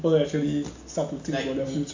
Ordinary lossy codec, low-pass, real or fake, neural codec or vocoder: AAC, 48 kbps; 7.2 kHz; real; none